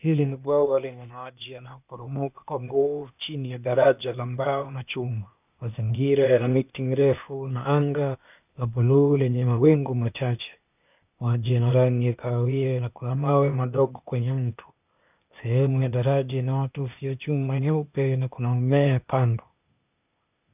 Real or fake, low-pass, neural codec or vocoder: fake; 3.6 kHz; codec, 16 kHz, 0.8 kbps, ZipCodec